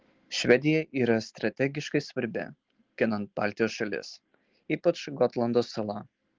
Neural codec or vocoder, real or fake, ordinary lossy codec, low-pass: none; real; Opus, 16 kbps; 7.2 kHz